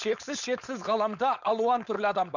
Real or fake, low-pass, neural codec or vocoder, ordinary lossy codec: fake; 7.2 kHz; codec, 16 kHz, 4.8 kbps, FACodec; none